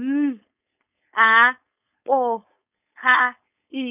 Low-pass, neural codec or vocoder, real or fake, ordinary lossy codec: 3.6 kHz; codec, 16 kHz, 4.8 kbps, FACodec; fake; none